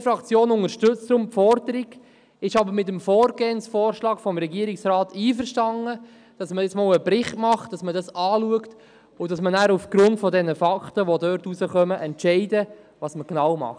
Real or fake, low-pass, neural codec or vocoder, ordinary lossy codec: real; 9.9 kHz; none; none